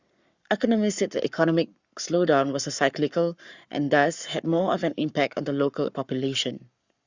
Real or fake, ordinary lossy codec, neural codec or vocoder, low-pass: fake; Opus, 64 kbps; codec, 44.1 kHz, 7.8 kbps, Pupu-Codec; 7.2 kHz